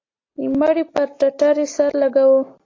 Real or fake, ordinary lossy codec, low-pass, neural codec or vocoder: real; AAC, 32 kbps; 7.2 kHz; none